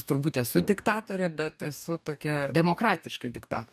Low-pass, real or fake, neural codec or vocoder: 14.4 kHz; fake; codec, 44.1 kHz, 2.6 kbps, DAC